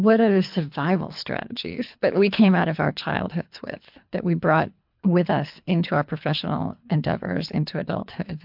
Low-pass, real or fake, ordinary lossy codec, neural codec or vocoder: 5.4 kHz; fake; MP3, 48 kbps; codec, 24 kHz, 3 kbps, HILCodec